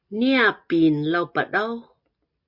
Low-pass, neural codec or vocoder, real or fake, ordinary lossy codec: 5.4 kHz; none; real; MP3, 48 kbps